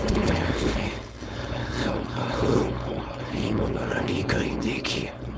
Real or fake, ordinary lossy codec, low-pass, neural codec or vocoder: fake; none; none; codec, 16 kHz, 4.8 kbps, FACodec